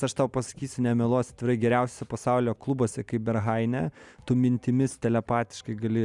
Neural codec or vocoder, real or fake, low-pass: none; real; 10.8 kHz